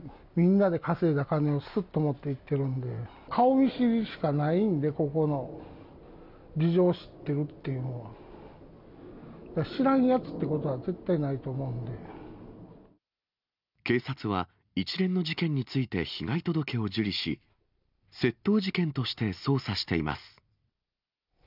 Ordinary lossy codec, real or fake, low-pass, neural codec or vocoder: none; real; 5.4 kHz; none